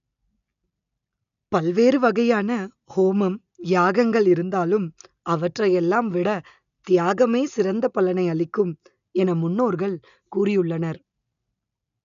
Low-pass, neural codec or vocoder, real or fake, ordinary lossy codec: 7.2 kHz; none; real; none